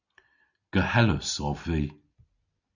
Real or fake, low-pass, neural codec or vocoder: real; 7.2 kHz; none